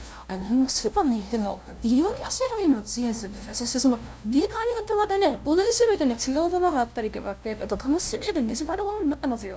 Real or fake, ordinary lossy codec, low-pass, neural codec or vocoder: fake; none; none; codec, 16 kHz, 0.5 kbps, FunCodec, trained on LibriTTS, 25 frames a second